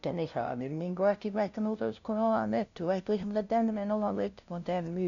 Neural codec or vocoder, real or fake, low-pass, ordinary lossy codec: codec, 16 kHz, 0.5 kbps, FunCodec, trained on LibriTTS, 25 frames a second; fake; 7.2 kHz; none